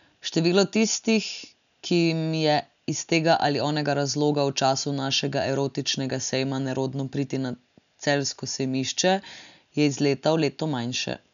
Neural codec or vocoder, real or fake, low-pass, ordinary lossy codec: none; real; 7.2 kHz; none